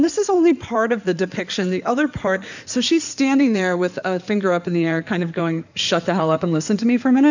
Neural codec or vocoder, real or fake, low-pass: codec, 16 kHz in and 24 kHz out, 2.2 kbps, FireRedTTS-2 codec; fake; 7.2 kHz